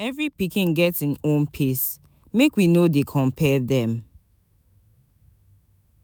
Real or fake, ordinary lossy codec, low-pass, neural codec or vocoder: fake; none; none; autoencoder, 48 kHz, 128 numbers a frame, DAC-VAE, trained on Japanese speech